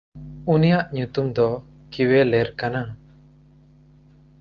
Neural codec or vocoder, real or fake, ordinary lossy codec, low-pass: none; real; Opus, 32 kbps; 7.2 kHz